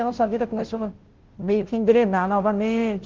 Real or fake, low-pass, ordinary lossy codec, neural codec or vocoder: fake; 7.2 kHz; Opus, 16 kbps; codec, 16 kHz, 0.5 kbps, FunCodec, trained on Chinese and English, 25 frames a second